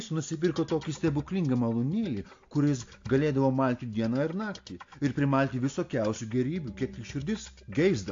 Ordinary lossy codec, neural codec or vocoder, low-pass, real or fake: AAC, 48 kbps; none; 7.2 kHz; real